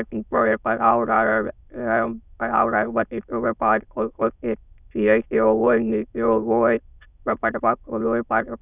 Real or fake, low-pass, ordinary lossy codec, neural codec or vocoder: fake; 3.6 kHz; none; autoencoder, 22.05 kHz, a latent of 192 numbers a frame, VITS, trained on many speakers